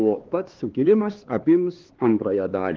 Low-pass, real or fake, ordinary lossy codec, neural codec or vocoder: 7.2 kHz; fake; Opus, 16 kbps; codec, 16 kHz, 2 kbps, X-Codec, HuBERT features, trained on LibriSpeech